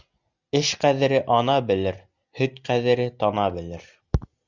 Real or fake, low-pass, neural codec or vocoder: real; 7.2 kHz; none